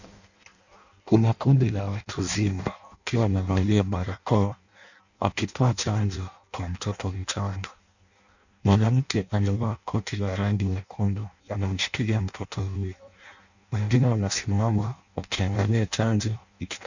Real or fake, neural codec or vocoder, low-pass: fake; codec, 16 kHz in and 24 kHz out, 0.6 kbps, FireRedTTS-2 codec; 7.2 kHz